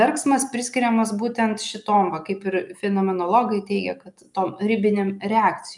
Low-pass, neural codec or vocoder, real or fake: 10.8 kHz; none; real